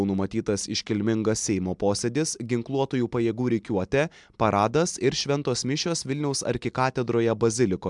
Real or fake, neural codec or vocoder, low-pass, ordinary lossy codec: real; none; 10.8 kHz; MP3, 96 kbps